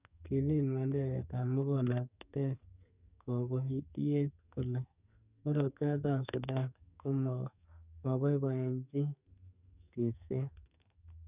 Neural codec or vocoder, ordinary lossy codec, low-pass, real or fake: codec, 44.1 kHz, 2.6 kbps, SNAC; none; 3.6 kHz; fake